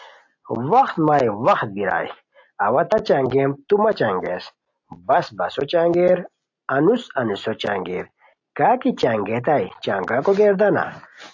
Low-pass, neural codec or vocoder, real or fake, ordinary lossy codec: 7.2 kHz; none; real; AAC, 48 kbps